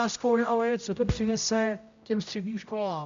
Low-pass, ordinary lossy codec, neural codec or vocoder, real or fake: 7.2 kHz; MP3, 64 kbps; codec, 16 kHz, 0.5 kbps, X-Codec, HuBERT features, trained on general audio; fake